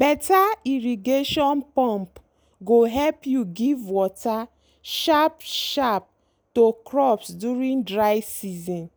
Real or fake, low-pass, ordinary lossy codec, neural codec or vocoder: real; none; none; none